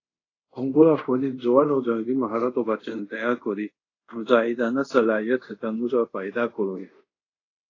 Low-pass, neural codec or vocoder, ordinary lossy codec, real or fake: 7.2 kHz; codec, 24 kHz, 0.5 kbps, DualCodec; AAC, 32 kbps; fake